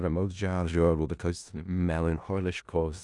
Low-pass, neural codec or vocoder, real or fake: 10.8 kHz; codec, 16 kHz in and 24 kHz out, 0.4 kbps, LongCat-Audio-Codec, four codebook decoder; fake